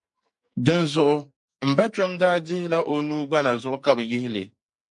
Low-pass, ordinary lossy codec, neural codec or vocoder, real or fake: 10.8 kHz; MP3, 64 kbps; codec, 44.1 kHz, 2.6 kbps, SNAC; fake